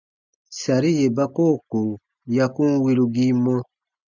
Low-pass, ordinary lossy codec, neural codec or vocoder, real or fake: 7.2 kHz; MP3, 64 kbps; none; real